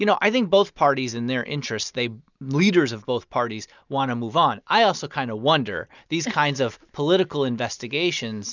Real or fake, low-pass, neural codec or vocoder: real; 7.2 kHz; none